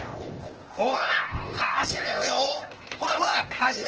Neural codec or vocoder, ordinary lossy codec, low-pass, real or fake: codec, 16 kHz, 0.8 kbps, ZipCodec; Opus, 16 kbps; 7.2 kHz; fake